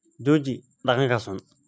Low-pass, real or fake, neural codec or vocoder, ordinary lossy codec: none; real; none; none